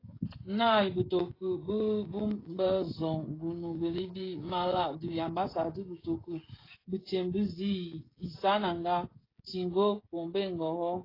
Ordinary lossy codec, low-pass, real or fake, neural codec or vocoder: AAC, 24 kbps; 5.4 kHz; fake; codec, 16 kHz, 16 kbps, FreqCodec, smaller model